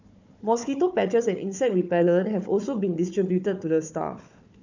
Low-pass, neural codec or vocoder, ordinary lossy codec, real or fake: 7.2 kHz; codec, 16 kHz, 4 kbps, FunCodec, trained on Chinese and English, 50 frames a second; none; fake